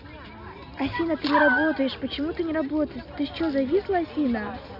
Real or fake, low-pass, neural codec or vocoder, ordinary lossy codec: real; 5.4 kHz; none; none